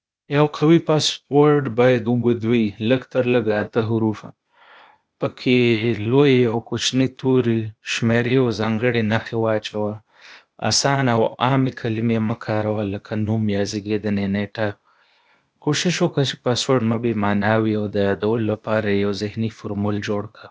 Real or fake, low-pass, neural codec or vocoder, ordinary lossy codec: fake; none; codec, 16 kHz, 0.8 kbps, ZipCodec; none